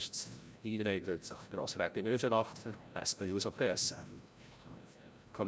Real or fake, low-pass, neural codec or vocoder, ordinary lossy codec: fake; none; codec, 16 kHz, 0.5 kbps, FreqCodec, larger model; none